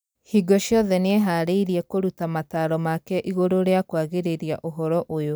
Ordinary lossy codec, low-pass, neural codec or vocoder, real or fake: none; none; none; real